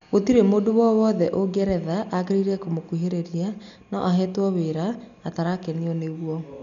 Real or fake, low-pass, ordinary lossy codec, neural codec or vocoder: real; 7.2 kHz; none; none